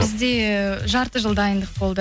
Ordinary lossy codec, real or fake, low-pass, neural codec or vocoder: none; real; none; none